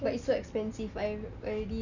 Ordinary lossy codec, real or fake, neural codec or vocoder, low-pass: none; real; none; 7.2 kHz